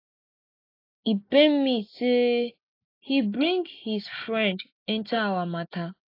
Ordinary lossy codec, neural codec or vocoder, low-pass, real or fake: AAC, 32 kbps; none; 5.4 kHz; real